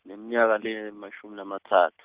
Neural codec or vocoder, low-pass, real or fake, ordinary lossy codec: none; 3.6 kHz; real; Opus, 32 kbps